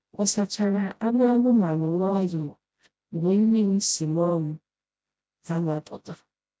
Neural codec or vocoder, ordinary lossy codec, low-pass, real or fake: codec, 16 kHz, 0.5 kbps, FreqCodec, smaller model; none; none; fake